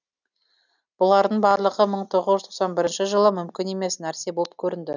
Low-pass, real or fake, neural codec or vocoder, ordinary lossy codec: 7.2 kHz; real; none; none